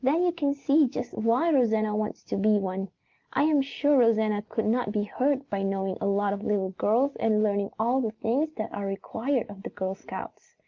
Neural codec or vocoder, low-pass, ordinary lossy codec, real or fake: none; 7.2 kHz; Opus, 32 kbps; real